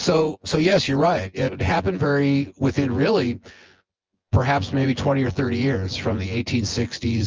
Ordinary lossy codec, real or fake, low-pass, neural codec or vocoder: Opus, 24 kbps; fake; 7.2 kHz; vocoder, 24 kHz, 100 mel bands, Vocos